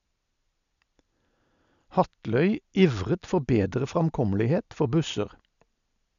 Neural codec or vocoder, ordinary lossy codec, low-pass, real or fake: none; none; 7.2 kHz; real